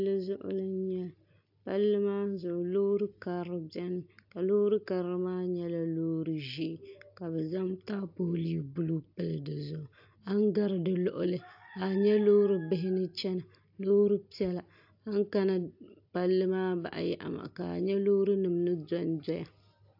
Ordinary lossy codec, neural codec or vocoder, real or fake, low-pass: AAC, 48 kbps; none; real; 5.4 kHz